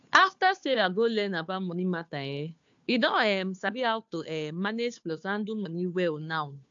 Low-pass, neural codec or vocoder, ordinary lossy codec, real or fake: 7.2 kHz; codec, 16 kHz, 2 kbps, FunCodec, trained on Chinese and English, 25 frames a second; AAC, 64 kbps; fake